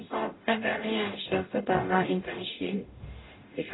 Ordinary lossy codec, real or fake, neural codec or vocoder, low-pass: AAC, 16 kbps; fake; codec, 44.1 kHz, 0.9 kbps, DAC; 7.2 kHz